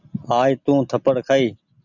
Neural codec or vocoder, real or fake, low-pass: none; real; 7.2 kHz